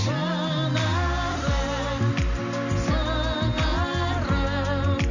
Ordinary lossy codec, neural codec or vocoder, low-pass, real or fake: none; none; 7.2 kHz; real